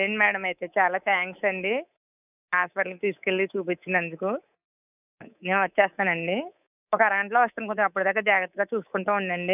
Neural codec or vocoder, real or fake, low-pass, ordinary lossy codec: codec, 24 kHz, 3.1 kbps, DualCodec; fake; 3.6 kHz; none